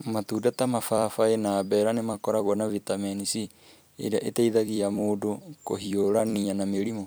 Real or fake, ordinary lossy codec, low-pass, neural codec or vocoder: fake; none; none; vocoder, 44.1 kHz, 128 mel bands every 256 samples, BigVGAN v2